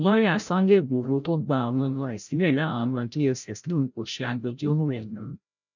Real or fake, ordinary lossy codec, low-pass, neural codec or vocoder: fake; none; 7.2 kHz; codec, 16 kHz, 0.5 kbps, FreqCodec, larger model